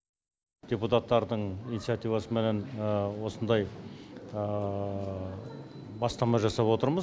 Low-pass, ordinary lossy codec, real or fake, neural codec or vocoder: none; none; real; none